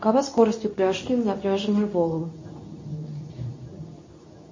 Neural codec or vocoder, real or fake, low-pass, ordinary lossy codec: codec, 24 kHz, 0.9 kbps, WavTokenizer, medium speech release version 2; fake; 7.2 kHz; MP3, 32 kbps